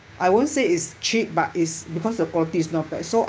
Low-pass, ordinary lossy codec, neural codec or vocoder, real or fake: none; none; codec, 16 kHz, 6 kbps, DAC; fake